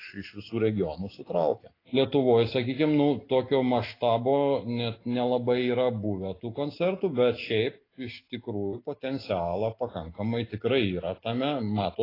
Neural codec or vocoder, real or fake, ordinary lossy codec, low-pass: none; real; AAC, 24 kbps; 5.4 kHz